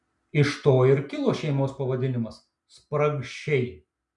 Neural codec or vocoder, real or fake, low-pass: none; real; 10.8 kHz